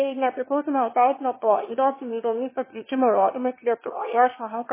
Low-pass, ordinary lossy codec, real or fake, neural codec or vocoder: 3.6 kHz; MP3, 16 kbps; fake; autoencoder, 22.05 kHz, a latent of 192 numbers a frame, VITS, trained on one speaker